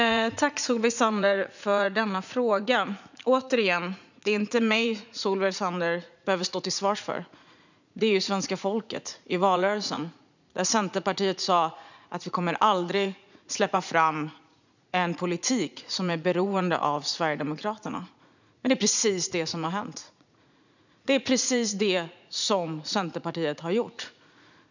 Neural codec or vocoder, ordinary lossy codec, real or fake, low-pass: vocoder, 44.1 kHz, 80 mel bands, Vocos; none; fake; 7.2 kHz